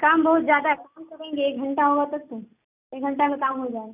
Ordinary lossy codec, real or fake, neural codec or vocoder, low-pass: none; real; none; 3.6 kHz